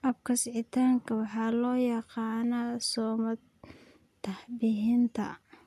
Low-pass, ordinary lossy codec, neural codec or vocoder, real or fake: 14.4 kHz; none; none; real